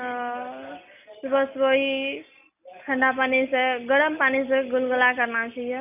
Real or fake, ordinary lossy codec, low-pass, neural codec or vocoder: real; none; 3.6 kHz; none